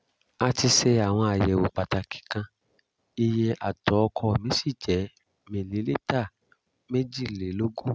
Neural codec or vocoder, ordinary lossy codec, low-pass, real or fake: none; none; none; real